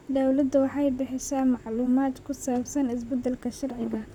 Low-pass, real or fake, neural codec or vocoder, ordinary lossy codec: 19.8 kHz; fake; vocoder, 44.1 kHz, 128 mel bands, Pupu-Vocoder; none